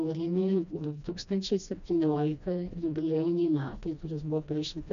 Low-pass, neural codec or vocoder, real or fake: 7.2 kHz; codec, 16 kHz, 1 kbps, FreqCodec, smaller model; fake